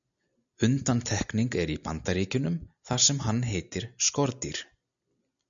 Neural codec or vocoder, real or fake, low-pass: none; real; 7.2 kHz